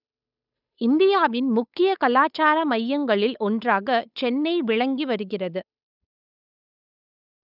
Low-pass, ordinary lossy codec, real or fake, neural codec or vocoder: 5.4 kHz; none; fake; codec, 16 kHz, 8 kbps, FunCodec, trained on Chinese and English, 25 frames a second